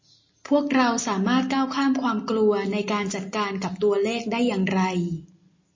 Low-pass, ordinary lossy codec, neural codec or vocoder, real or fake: 7.2 kHz; MP3, 32 kbps; none; real